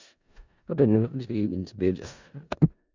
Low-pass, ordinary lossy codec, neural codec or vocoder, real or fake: 7.2 kHz; MP3, 64 kbps; codec, 16 kHz in and 24 kHz out, 0.4 kbps, LongCat-Audio-Codec, four codebook decoder; fake